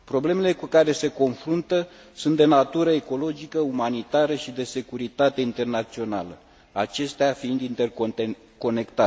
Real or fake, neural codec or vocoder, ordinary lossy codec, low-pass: real; none; none; none